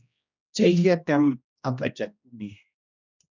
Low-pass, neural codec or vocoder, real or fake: 7.2 kHz; codec, 16 kHz, 1 kbps, X-Codec, HuBERT features, trained on general audio; fake